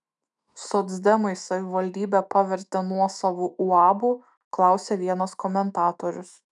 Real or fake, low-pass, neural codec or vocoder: fake; 10.8 kHz; autoencoder, 48 kHz, 128 numbers a frame, DAC-VAE, trained on Japanese speech